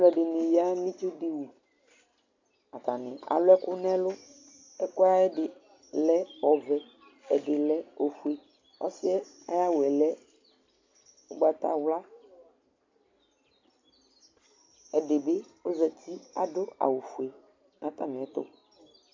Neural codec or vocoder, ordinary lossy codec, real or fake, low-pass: none; AAC, 48 kbps; real; 7.2 kHz